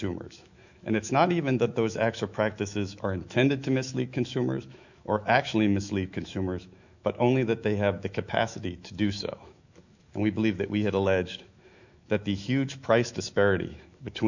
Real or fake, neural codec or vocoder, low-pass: fake; autoencoder, 48 kHz, 128 numbers a frame, DAC-VAE, trained on Japanese speech; 7.2 kHz